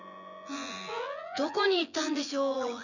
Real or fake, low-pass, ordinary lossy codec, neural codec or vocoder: fake; 7.2 kHz; none; vocoder, 24 kHz, 100 mel bands, Vocos